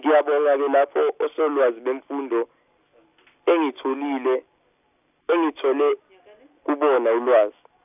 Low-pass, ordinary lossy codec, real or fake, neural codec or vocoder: 3.6 kHz; none; real; none